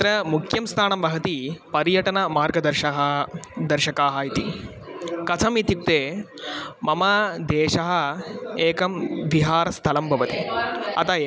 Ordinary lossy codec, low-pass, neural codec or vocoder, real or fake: none; none; none; real